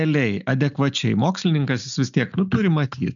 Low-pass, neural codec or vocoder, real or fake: 7.2 kHz; none; real